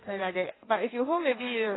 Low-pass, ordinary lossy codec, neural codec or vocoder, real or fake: 7.2 kHz; AAC, 16 kbps; codec, 16 kHz in and 24 kHz out, 1.1 kbps, FireRedTTS-2 codec; fake